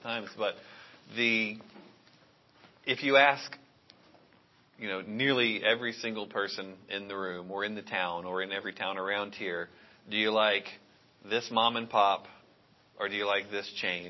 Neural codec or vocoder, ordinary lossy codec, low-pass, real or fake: none; MP3, 24 kbps; 7.2 kHz; real